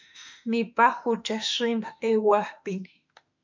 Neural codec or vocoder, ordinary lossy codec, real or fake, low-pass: autoencoder, 48 kHz, 32 numbers a frame, DAC-VAE, trained on Japanese speech; MP3, 64 kbps; fake; 7.2 kHz